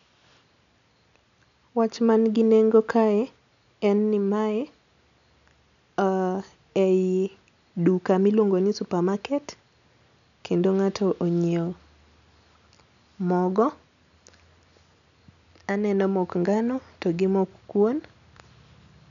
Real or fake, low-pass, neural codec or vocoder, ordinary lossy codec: real; 7.2 kHz; none; none